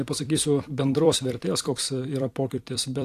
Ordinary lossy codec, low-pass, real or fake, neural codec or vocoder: AAC, 64 kbps; 14.4 kHz; fake; vocoder, 44.1 kHz, 128 mel bands every 256 samples, BigVGAN v2